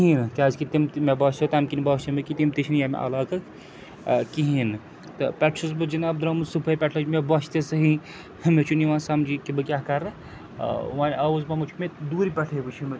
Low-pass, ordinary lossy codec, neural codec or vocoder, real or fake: none; none; none; real